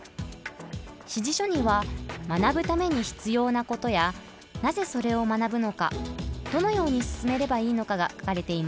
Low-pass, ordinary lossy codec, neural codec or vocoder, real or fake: none; none; none; real